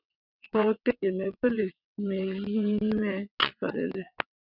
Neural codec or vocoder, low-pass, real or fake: vocoder, 22.05 kHz, 80 mel bands, WaveNeXt; 5.4 kHz; fake